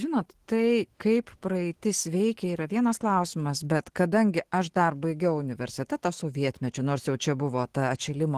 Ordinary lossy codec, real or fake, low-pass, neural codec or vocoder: Opus, 16 kbps; fake; 14.4 kHz; autoencoder, 48 kHz, 128 numbers a frame, DAC-VAE, trained on Japanese speech